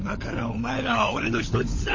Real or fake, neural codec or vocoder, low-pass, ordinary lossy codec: fake; codec, 16 kHz, 16 kbps, FunCodec, trained on LibriTTS, 50 frames a second; 7.2 kHz; MP3, 32 kbps